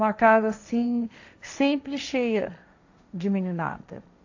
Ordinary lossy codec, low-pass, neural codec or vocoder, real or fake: AAC, 48 kbps; 7.2 kHz; codec, 16 kHz, 1.1 kbps, Voila-Tokenizer; fake